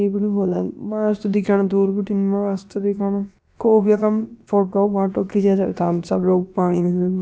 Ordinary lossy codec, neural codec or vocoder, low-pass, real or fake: none; codec, 16 kHz, about 1 kbps, DyCAST, with the encoder's durations; none; fake